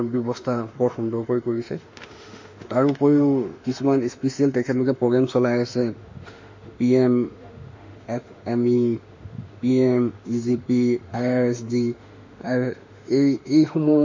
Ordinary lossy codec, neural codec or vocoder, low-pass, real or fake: MP3, 48 kbps; autoencoder, 48 kHz, 32 numbers a frame, DAC-VAE, trained on Japanese speech; 7.2 kHz; fake